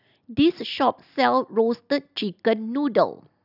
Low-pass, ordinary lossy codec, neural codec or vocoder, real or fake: 5.4 kHz; none; none; real